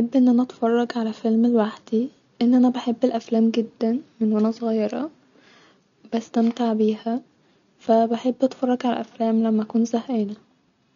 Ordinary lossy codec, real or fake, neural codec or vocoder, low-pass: none; real; none; 7.2 kHz